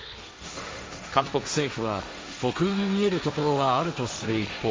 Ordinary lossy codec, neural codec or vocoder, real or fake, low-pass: none; codec, 16 kHz, 1.1 kbps, Voila-Tokenizer; fake; none